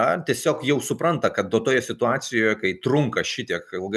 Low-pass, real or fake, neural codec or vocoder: 14.4 kHz; real; none